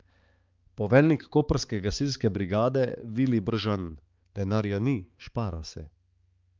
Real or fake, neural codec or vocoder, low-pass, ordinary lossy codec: fake; codec, 16 kHz, 4 kbps, X-Codec, HuBERT features, trained on balanced general audio; 7.2 kHz; Opus, 32 kbps